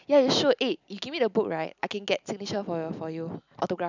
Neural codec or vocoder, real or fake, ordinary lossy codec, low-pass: none; real; none; 7.2 kHz